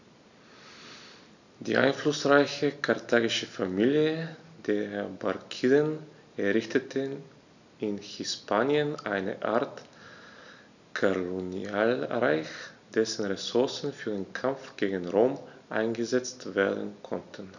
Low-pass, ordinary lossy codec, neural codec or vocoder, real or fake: 7.2 kHz; none; none; real